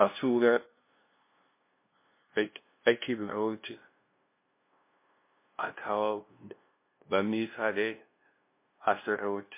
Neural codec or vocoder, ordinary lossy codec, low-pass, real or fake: codec, 16 kHz, 0.5 kbps, FunCodec, trained on LibriTTS, 25 frames a second; MP3, 24 kbps; 3.6 kHz; fake